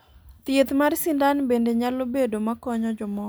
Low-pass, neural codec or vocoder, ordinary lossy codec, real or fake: none; none; none; real